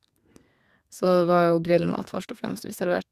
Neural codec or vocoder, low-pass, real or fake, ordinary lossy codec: codec, 32 kHz, 1.9 kbps, SNAC; 14.4 kHz; fake; Opus, 64 kbps